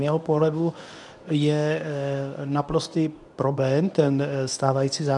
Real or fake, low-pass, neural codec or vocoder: fake; 10.8 kHz; codec, 24 kHz, 0.9 kbps, WavTokenizer, medium speech release version 1